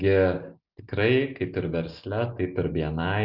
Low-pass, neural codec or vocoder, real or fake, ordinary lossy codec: 5.4 kHz; none; real; Opus, 64 kbps